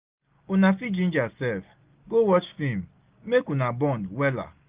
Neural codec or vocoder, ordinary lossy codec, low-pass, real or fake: none; Opus, 32 kbps; 3.6 kHz; real